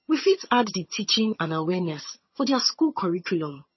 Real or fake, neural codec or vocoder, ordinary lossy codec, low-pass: fake; vocoder, 22.05 kHz, 80 mel bands, HiFi-GAN; MP3, 24 kbps; 7.2 kHz